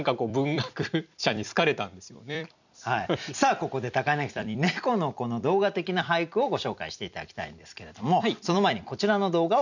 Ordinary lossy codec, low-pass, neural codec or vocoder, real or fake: none; 7.2 kHz; vocoder, 44.1 kHz, 128 mel bands every 256 samples, BigVGAN v2; fake